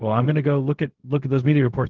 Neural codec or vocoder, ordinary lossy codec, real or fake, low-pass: codec, 16 kHz, 0.4 kbps, LongCat-Audio-Codec; Opus, 16 kbps; fake; 7.2 kHz